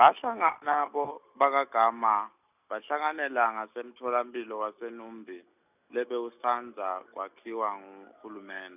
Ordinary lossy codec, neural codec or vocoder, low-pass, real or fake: none; none; 3.6 kHz; real